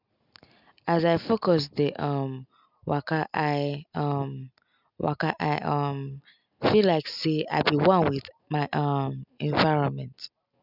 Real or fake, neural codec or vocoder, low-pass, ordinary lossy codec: real; none; 5.4 kHz; none